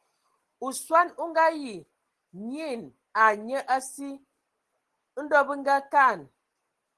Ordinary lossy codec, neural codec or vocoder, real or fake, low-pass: Opus, 16 kbps; none; real; 10.8 kHz